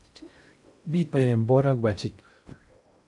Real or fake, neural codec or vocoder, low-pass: fake; codec, 16 kHz in and 24 kHz out, 0.6 kbps, FocalCodec, streaming, 4096 codes; 10.8 kHz